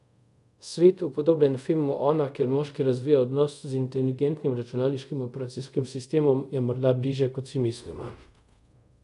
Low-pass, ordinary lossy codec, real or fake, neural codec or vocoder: 10.8 kHz; none; fake; codec, 24 kHz, 0.5 kbps, DualCodec